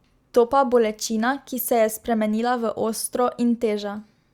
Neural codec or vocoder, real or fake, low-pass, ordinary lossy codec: none; real; 19.8 kHz; Opus, 64 kbps